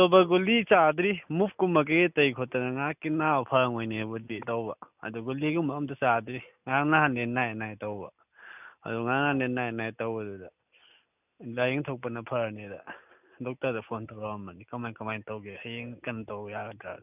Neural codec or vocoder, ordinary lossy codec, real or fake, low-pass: none; none; real; 3.6 kHz